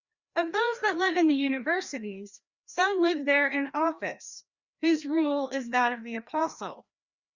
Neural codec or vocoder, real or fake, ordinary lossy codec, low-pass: codec, 16 kHz, 1 kbps, FreqCodec, larger model; fake; Opus, 64 kbps; 7.2 kHz